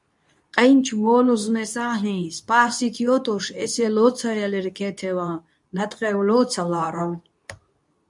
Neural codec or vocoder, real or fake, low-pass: codec, 24 kHz, 0.9 kbps, WavTokenizer, medium speech release version 2; fake; 10.8 kHz